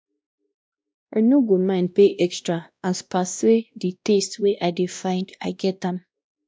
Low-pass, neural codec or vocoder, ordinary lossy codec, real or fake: none; codec, 16 kHz, 1 kbps, X-Codec, WavLM features, trained on Multilingual LibriSpeech; none; fake